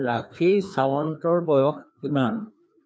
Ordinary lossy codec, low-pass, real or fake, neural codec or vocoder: none; none; fake; codec, 16 kHz, 2 kbps, FreqCodec, larger model